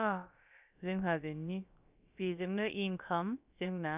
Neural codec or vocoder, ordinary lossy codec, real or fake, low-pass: codec, 16 kHz, about 1 kbps, DyCAST, with the encoder's durations; none; fake; 3.6 kHz